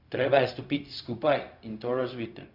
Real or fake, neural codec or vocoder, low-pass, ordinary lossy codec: fake; codec, 16 kHz, 0.4 kbps, LongCat-Audio-Codec; 5.4 kHz; none